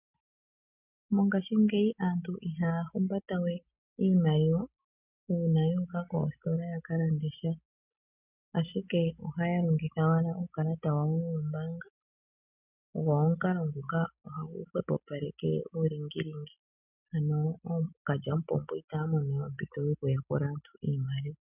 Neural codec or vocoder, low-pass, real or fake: none; 3.6 kHz; real